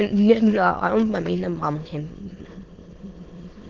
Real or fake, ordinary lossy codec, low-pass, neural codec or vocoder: fake; Opus, 16 kbps; 7.2 kHz; autoencoder, 22.05 kHz, a latent of 192 numbers a frame, VITS, trained on many speakers